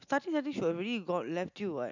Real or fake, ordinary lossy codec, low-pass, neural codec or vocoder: real; none; 7.2 kHz; none